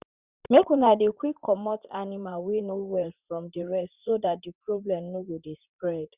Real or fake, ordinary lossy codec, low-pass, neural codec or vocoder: fake; Opus, 64 kbps; 3.6 kHz; vocoder, 44.1 kHz, 128 mel bands, Pupu-Vocoder